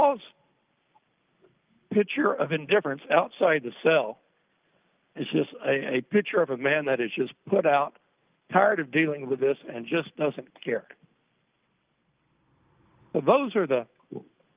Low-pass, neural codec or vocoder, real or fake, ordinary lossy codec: 3.6 kHz; none; real; Opus, 24 kbps